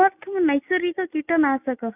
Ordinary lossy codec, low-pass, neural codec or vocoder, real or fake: none; 3.6 kHz; none; real